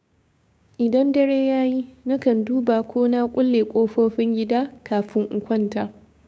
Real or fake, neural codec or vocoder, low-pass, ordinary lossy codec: fake; codec, 16 kHz, 6 kbps, DAC; none; none